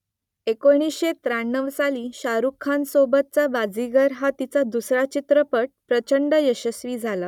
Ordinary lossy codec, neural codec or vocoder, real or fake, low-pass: none; none; real; 19.8 kHz